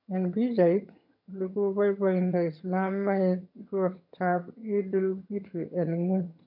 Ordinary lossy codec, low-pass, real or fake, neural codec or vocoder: none; 5.4 kHz; fake; vocoder, 22.05 kHz, 80 mel bands, HiFi-GAN